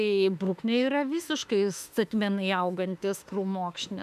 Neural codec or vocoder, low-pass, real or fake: autoencoder, 48 kHz, 32 numbers a frame, DAC-VAE, trained on Japanese speech; 14.4 kHz; fake